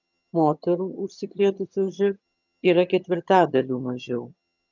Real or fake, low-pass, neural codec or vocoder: fake; 7.2 kHz; vocoder, 22.05 kHz, 80 mel bands, HiFi-GAN